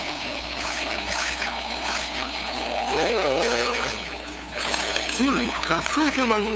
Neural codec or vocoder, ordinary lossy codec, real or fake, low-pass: codec, 16 kHz, 2 kbps, FunCodec, trained on LibriTTS, 25 frames a second; none; fake; none